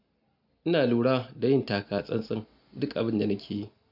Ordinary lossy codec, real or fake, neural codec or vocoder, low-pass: none; real; none; 5.4 kHz